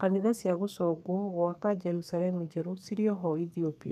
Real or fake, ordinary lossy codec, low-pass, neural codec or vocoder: fake; none; 14.4 kHz; codec, 32 kHz, 1.9 kbps, SNAC